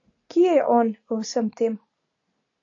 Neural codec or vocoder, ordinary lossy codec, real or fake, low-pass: none; AAC, 48 kbps; real; 7.2 kHz